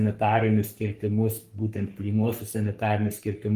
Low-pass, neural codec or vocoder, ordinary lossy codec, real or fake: 14.4 kHz; codec, 44.1 kHz, 3.4 kbps, Pupu-Codec; Opus, 32 kbps; fake